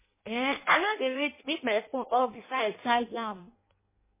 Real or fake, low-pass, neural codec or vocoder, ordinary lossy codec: fake; 3.6 kHz; codec, 16 kHz in and 24 kHz out, 0.6 kbps, FireRedTTS-2 codec; MP3, 16 kbps